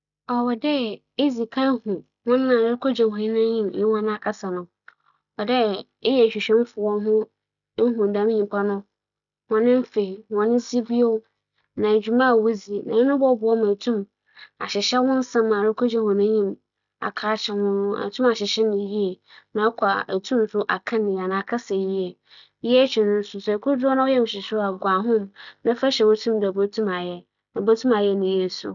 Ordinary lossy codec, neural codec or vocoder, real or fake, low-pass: none; none; real; 7.2 kHz